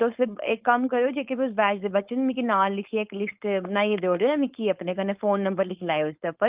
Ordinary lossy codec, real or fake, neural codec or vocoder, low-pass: Opus, 32 kbps; fake; codec, 16 kHz, 4.8 kbps, FACodec; 3.6 kHz